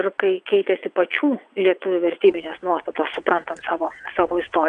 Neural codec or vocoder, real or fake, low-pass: vocoder, 22.05 kHz, 80 mel bands, WaveNeXt; fake; 9.9 kHz